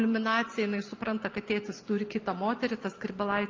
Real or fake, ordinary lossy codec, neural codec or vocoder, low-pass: real; Opus, 32 kbps; none; 7.2 kHz